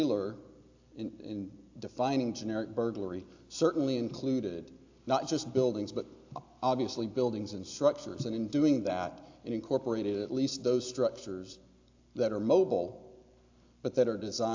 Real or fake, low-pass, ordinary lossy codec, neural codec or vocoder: real; 7.2 kHz; AAC, 48 kbps; none